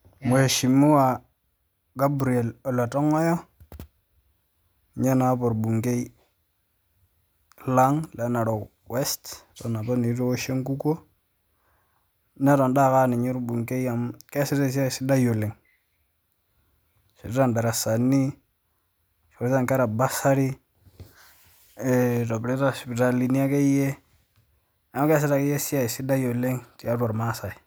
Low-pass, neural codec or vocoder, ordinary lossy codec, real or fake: none; none; none; real